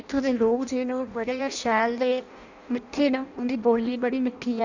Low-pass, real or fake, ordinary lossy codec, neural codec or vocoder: 7.2 kHz; fake; Opus, 64 kbps; codec, 16 kHz in and 24 kHz out, 0.6 kbps, FireRedTTS-2 codec